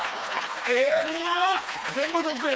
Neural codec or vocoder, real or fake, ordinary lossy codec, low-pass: codec, 16 kHz, 2 kbps, FreqCodec, smaller model; fake; none; none